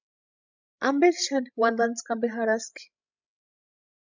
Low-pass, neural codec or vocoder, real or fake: 7.2 kHz; codec, 16 kHz, 16 kbps, FreqCodec, larger model; fake